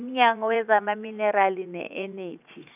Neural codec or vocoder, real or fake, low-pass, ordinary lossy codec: vocoder, 44.1 kHz, 80 mel bands, Vocos; fake; 3.6 kHz; none